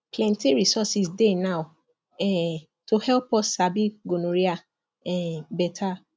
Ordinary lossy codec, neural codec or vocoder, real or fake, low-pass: none; none; real; none